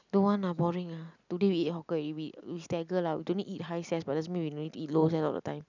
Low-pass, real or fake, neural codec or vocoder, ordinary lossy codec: 7.2 kHz; real; none; none